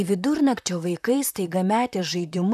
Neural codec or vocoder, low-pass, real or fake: vocoder, 44.1 kHz, 128 mel bands, Pupu-Vocoder; 14.4 kHz; fake